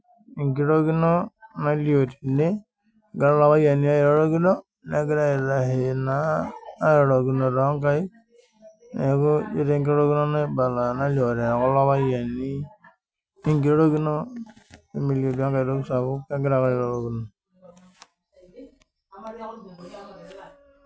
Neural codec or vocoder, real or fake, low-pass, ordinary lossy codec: none; real; none; none